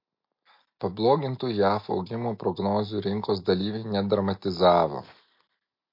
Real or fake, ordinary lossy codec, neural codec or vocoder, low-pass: real; MP3, 32 kbps; none; 5.4 kHz